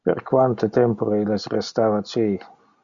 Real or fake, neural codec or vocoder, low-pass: real; none; 7.2 kHz